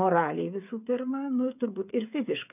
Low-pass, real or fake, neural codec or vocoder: 3.6 kHz; fake; codec, 16 kHz, 8 kbps, FreqCodec, smaller model